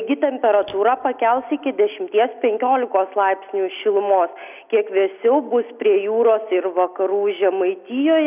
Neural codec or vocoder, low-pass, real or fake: none; 3.6 kHz; real